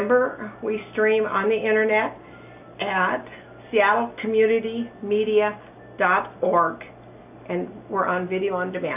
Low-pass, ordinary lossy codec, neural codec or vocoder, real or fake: 3.6 kHz; AAC, 32 kbps; none; real